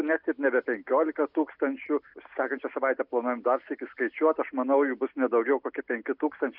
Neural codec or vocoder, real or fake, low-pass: none; real; 5.4 kHz